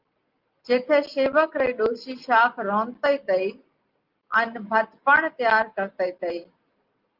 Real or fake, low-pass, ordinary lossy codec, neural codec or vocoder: real; 5.4 kHz; Opus, 32 kbps; none